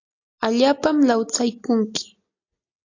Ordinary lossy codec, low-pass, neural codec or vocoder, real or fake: AAC, 48 kbps; 7.2 kHz; none; real